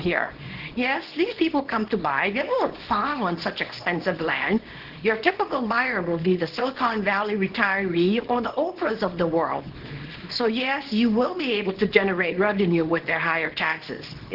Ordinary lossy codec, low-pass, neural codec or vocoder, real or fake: Opus, 16 kbps; 5.4 kHz; codec, 24 kHz, 0.9 kbps, WavTokenizer, small release; fake